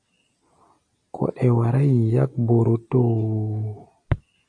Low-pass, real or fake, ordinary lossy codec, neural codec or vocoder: 9.9 kHz; real; AAC, 64 kbps; none